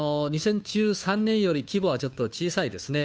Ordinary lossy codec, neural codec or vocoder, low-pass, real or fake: none; codec, 16 kHz, 2 kbps, FunCodec, trained on Chinese and English, 25 frames a second; none; fake